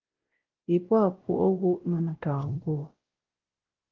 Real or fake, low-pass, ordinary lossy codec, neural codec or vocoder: fake; 7.2 kHz; Opus, 16 kbps; codec, 16 kHz, 0.5 kbps, X-Codec, WavLM features, trained on Multilingual LibriSpeech